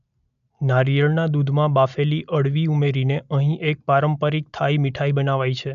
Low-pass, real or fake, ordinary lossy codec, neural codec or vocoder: 7.2 kHz; real; none; none